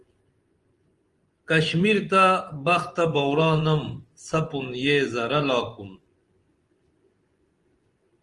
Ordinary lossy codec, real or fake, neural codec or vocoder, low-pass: Opus, 24 kbps; fake; vocoder, 24 kHz, 100 mel bands, Vocos; 10.8 kHz